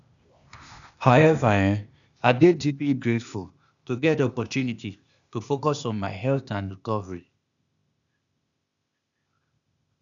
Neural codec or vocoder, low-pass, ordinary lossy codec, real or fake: codec, 16 kHz, 0.8 kbps, ZipCodec; 7.2 kHz; none; fake